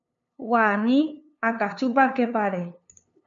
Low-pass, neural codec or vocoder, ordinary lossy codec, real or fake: 7.2 kHz; codec, 16 kHz, 2 kbps, FunCodec, trained on LibriTTS, 25 frames a second; AAC, 64 kbps; fake